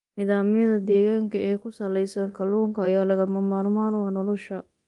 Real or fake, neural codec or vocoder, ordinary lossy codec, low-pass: fake; codec, 24 kHz, 0.9 kbps, DualCodec; Opus, 32 kbps; 10.8 kHz